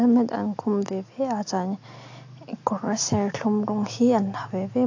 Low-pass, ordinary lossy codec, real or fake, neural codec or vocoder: 7.2 kHz; AAC, 48 kbps; real; none